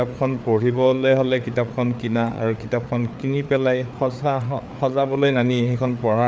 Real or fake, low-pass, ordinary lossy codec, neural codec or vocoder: fake; none; none; codec, 16 kHz, 4 kbps, FreqCodec, larger model